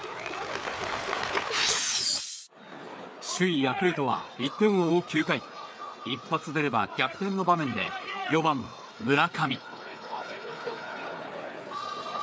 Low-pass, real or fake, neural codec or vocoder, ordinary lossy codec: none; fake; codec, 16 kHz, 4 kbps, FreqCodec, larger model; none